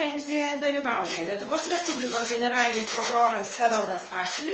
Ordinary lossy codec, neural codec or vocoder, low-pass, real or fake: Opus, 24 kbps; codec, 16 kHz, 2 kbps, X-Codec, WavLM features, trained on Multilingual LibriSpeech; 7.2 kHz; fake